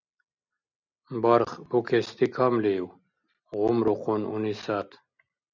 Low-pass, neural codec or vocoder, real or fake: 7.2 kHz; none; real